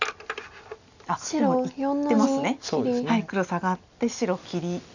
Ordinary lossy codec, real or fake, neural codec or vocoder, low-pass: none; real; none; 7.2 kHz